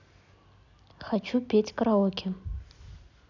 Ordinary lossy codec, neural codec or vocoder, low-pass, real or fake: none; none; 7.2 kHz; real